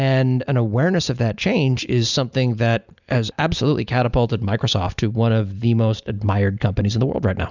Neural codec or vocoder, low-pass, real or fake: none; 7.2 kHz; real